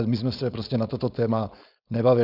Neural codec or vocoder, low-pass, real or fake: codec, 16 kHz, 4.8 kbps, FACodec; 5.4 kHz; fake